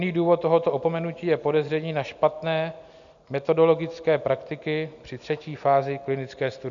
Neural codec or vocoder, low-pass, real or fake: none; 7.2 kHz; real